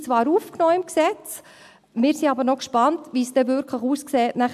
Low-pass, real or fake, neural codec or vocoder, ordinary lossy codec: 14.4 kHz; real; none; AAC, 96 kbps